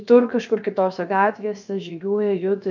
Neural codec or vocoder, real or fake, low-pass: codec, 16 kHz, about 1 kbps, DyCAST, with the encoder's durations; fake; 7.2 kHz